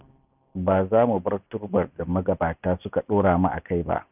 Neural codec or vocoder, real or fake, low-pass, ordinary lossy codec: none; real; 3.6 kHz; none